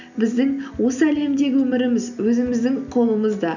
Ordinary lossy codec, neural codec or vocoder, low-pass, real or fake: none; none; 7.2 kHz; real